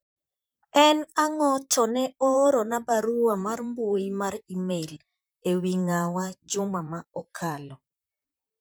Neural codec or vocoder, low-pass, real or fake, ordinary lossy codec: vocoder, 44.1 kHz, 128 mel bands, Pupu-Vocoder; none; fake; none